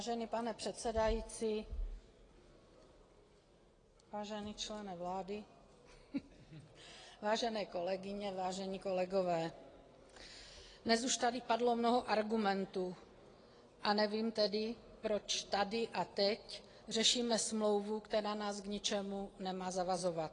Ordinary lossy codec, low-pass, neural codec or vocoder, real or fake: AAC, 32 kbps; 9.9 kHz; none; real